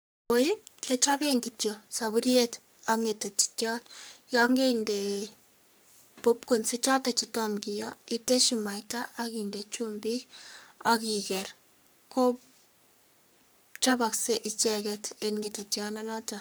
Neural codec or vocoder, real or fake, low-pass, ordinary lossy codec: codec, 44.1 kHz, 3.4 kbps, Pupu-Codec; fake; none; none